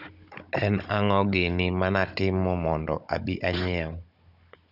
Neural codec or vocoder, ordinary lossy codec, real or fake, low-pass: codec, 16 kHz, 16 kbps, FunCodec, trained on Chinese and English, 50 frames a second; none; fake; 5.4 kHz